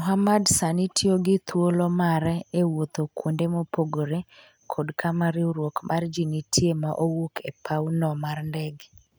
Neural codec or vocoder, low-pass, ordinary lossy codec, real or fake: none; none; none; real